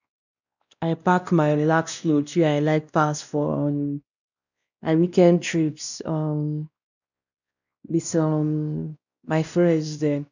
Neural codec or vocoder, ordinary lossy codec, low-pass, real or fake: codec, 16 kHz, 1 kbps, X-Codec, WavLM features, trained on Multilingual LibriSpeech; none; 7.2 kHz; fake